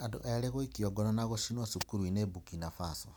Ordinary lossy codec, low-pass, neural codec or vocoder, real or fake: none; none; none; real